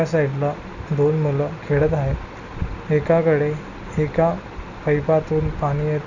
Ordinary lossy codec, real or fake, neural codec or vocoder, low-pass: none; real; none; 7.2 kHz